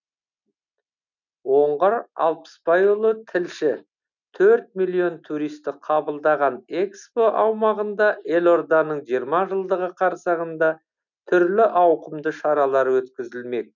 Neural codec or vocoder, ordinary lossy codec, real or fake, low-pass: none; none; real; 7.2 kHz